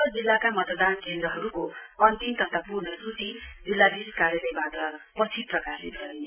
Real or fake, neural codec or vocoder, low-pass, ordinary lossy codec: real; none; 3.6 kHz; none